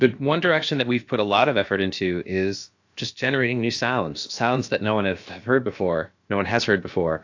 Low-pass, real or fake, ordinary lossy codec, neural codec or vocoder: 7.2 kHz; fake; AAC, 48 kbps; codec, 16 kHz, about 1 kbps, DyCAST, with the encoder's durations